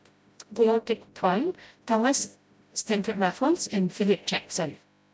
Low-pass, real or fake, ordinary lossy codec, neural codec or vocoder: none; fake; none; codec, 16 kHz, 0.5 kbps, FreqCodec, smaller model